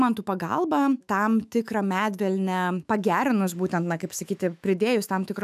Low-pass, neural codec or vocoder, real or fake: 14.4 kHz; autoencoder, 48 kHz, 128 numbers a frame, DAC-VAE, trained on Japanese speech; fake